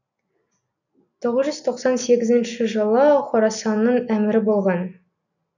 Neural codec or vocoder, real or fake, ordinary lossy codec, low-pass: none; real; none; 7.2 kHz